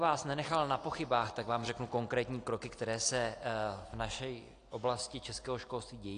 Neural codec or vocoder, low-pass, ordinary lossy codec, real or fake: none; 9.9 kHz; AAC, 48 kbps; real